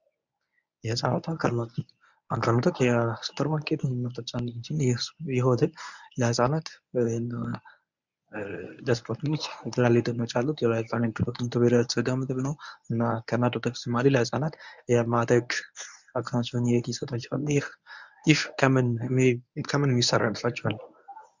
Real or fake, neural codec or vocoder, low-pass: fake; codec, 24 kHz, 0.9 kbps, WavTokenizer, medium speech release version 1; 7.2 kHz